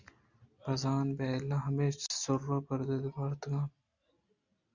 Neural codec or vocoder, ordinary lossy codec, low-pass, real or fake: none; Opus, 64 kbps; 7.2 kHz; real